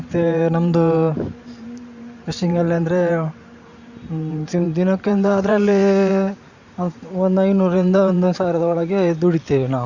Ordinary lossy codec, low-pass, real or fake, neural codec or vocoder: Opus, 64 kbps; 7.2 kHz; fake; vocoder, 44.1 kHz, 128 mel bands every 512 samples, BigVGAN v2